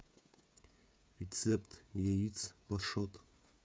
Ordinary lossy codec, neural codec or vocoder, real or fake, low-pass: none; codec, 16 kHz, 16 kbps, FreqCodec, smaller model; fake; none